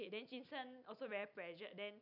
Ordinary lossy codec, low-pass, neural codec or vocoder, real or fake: none; 5.4 kHz; none; real